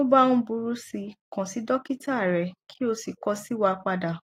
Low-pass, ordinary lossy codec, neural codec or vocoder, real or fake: 19.8 kHz; AAC, 48 kbps; none; real